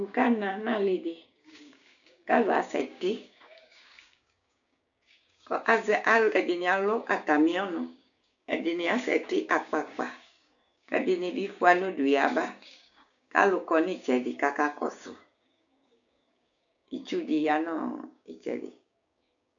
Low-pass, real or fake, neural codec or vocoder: 7.2 kHz; fake; codec, 16 kHz, 6 kbps, DAC